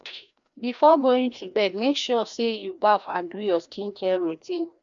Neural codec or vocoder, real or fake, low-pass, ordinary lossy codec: codec, 16 kHz, 1 kbps, FreqCodec, larger model; fake; 7.2 kHz; none